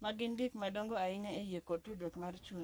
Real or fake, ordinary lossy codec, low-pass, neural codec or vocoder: fake; none; none; codec, 44.1 kHz, 3.4 kbps, Pupu-Codec